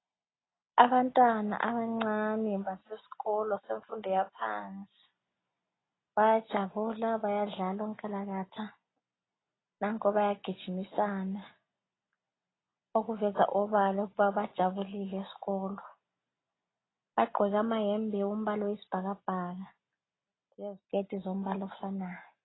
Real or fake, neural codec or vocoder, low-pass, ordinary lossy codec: real; none; 7.2 kHz; AAC, 16 kbps